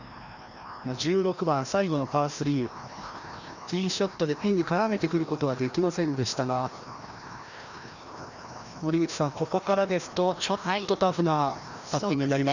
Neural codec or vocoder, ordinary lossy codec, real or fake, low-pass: codec, 16 kHz, 1 kbps, FreqCodec, larger model; none; fake; 7.2 kHz